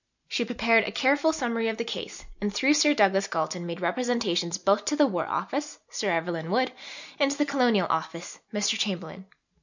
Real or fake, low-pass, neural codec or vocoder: real; 7.2 kHz; none